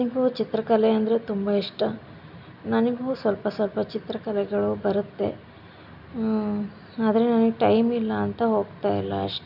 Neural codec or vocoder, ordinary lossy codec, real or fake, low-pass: none; none; real; 5.4 kHz